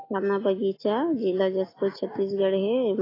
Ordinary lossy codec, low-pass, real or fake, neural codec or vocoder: AAC, 24 kbps; 5.4 kHz; real; none